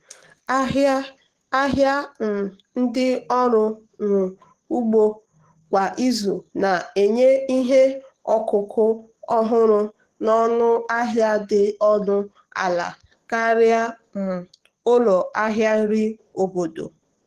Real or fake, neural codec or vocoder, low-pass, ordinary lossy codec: fake; codec, 44.1 kHz, 7.8 kbps, DAC; 14.4 kHz; Opus, 16 kbps